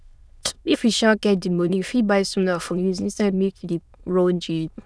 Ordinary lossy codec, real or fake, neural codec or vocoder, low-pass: none; fake; autoencoder, 22.05 kHz, a latent of 192 numbers a frame, VITS, trained on many speakers; none